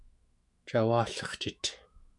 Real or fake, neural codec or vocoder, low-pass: fake; autoencoder, 48 kHz, 128 numbers a frame, DAC-VAE, trained on Japanese speech; 10.8 kHz